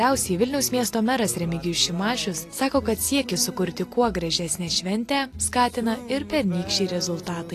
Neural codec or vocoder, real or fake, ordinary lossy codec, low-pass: none; real; AAC, 48 kbps; 14.4 kHz